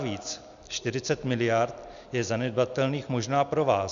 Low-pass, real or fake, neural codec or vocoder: 7.2 kHz; real; none